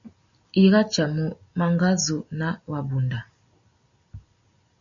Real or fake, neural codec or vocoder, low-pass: real; none; 7.2 kHz